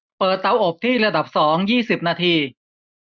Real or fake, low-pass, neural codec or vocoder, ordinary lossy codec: real; 7.2 kHz; none; none